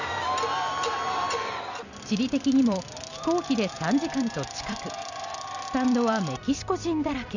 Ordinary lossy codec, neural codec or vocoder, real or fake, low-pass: none; vocoder, 44.1 kHz, 128 mel bands every 256 samples, BigVGAN v2; fake; 7.2 kHz